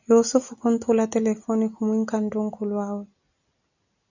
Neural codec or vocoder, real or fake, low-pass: none; real; 7.2 kHz